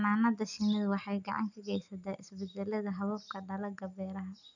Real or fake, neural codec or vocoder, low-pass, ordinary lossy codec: real; none; 7.2 kHz; none